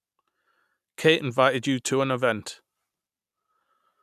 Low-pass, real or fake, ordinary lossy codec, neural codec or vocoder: 14.4 kHz; real; none; none